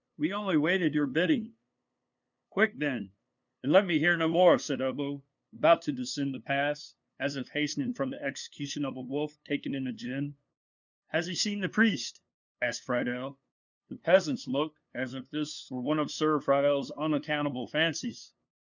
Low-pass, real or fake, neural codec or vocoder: 7.2 kHz; fake; codec, 16 kHz, 2 kbps, FunCodec, trained on LibriTTS, 25 frames a second